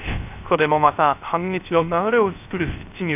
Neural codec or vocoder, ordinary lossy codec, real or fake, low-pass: codec, 16 kHz, 0.3 kbps, FocalCodec; none; fake; 3.6 kHz